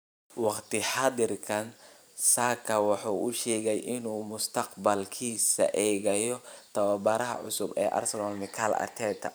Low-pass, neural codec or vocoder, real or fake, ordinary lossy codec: none; vocoder, 44.1 kHz, 128 mel bands every 512 samples, BigVGAN v2; fake; none